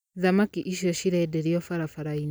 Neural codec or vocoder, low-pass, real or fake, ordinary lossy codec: none; none; real; none